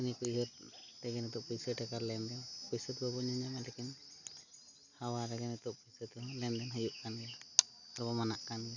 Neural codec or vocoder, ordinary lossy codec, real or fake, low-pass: none; none; real; 7.2 kHz